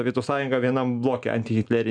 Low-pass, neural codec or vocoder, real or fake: 9.9 kHz; none; real